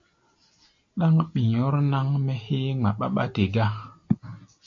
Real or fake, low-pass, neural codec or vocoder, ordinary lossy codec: real; 7.2 kHz; none; MP3, 48 kbps